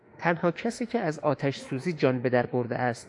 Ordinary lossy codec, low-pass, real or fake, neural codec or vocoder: AAC, 64 kbps; 10.8 kHz; fake; autoencoder, 48 kHz, 32 numbers a frame, DAC-VAE, trained on Japanese speech